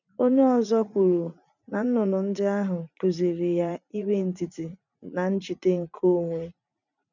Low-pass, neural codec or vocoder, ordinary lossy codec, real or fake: 7.2 kHz; vocoder, 44.1 kHz, 80 mel bands, Vocos; none; fake